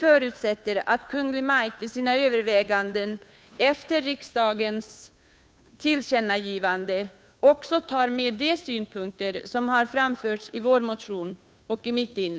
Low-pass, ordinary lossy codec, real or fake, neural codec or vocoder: none; none; fake; codec, 16 kHz, 2 kbps, FunCodec, trained on Chinese and English, 25 frames a second